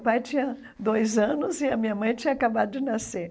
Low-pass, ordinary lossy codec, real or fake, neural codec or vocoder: none; none; real; none